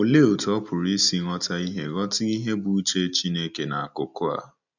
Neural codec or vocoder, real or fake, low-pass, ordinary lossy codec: none; real; 7.2 kHz; none